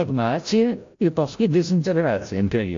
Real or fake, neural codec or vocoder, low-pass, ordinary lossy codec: fake; codec, 16 kHz, 0.5 kbps, FreqCodec, larger model; 7.2 kHz; AAC, 48 kbps